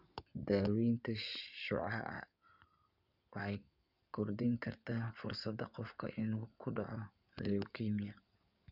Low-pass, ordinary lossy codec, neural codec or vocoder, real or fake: 5.4 kHz; none; codec, 16 kHz in and 24 kHz out, 2.2 kbps, FireRedTTS-2 codec; fake